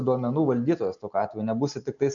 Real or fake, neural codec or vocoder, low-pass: real; none; 7.2 kHz